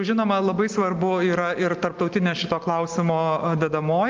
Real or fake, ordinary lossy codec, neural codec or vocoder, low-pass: real; Opus, 32 kbps; none; 7.2 kHz